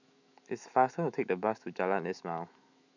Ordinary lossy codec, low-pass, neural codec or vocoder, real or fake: none; 7.2 kHz; autoencoder, 48 kHz, 128 numbers a frame, DAC-VAE, trained on Japanese speech; fake